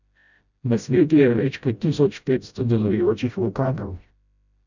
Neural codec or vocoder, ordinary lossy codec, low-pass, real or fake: codec, 16 kHz, 0.5 kbps, FreqCodec, smaller model; none; 7.2 kHz; fake